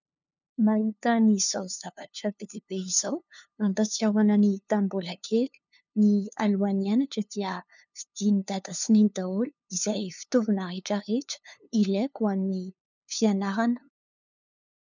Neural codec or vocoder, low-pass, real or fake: codec, 16 kHz, 2 kbps, FunCodec, trained on LibriTTS, 25 frames a second; 7.2 kHz; fake